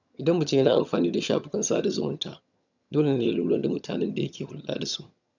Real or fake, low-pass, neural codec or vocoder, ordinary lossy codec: fake; 7.2 kHz; vocoder, 22.05 kHz, 80 mel bands, HiFi-GAN; none